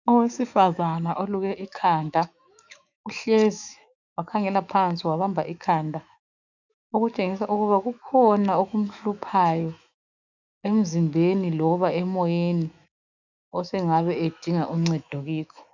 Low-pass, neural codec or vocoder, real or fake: 7.2 kHz; autoencoder, 48 kHz, 128 numbers a frame, DAC-VAE, trained on Japanese speech; fake